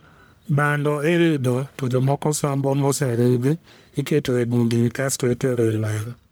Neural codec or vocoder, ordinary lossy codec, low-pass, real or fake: codec, 44.1 kHz, 1.7 kbps, Pupu-Codec; none; none; fake